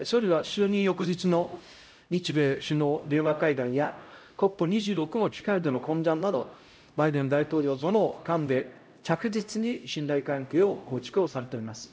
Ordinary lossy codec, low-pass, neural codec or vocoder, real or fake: none; none; codec, 16 kHz, 0.5 kbps, X-Codec, HuBERT features, trained on LibriSpeech; fake